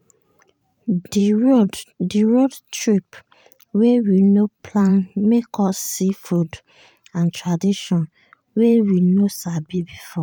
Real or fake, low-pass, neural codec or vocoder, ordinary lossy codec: fake; 19.8 kHz; vocoder, 44.1 kHz, 128 mel bands every 512 samples, BigVGAN v2; none